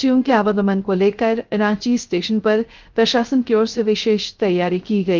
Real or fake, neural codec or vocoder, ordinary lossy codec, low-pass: fake; codec, 16 kHz, 0.3 kbps, FocalCodec; Opus, 24 kbps; 7.2 kHz